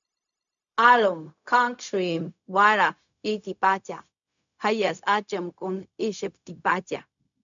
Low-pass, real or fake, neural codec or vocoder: 7.2 kHz; fake; codec, 16 kHz, 0.4 kbps, LongCat-Audio-Codec